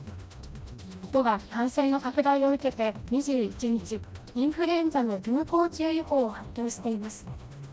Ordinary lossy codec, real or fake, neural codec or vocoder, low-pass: none; fake; codec, 16 kHz, 1 kbps, FreqCodec, smaller model; none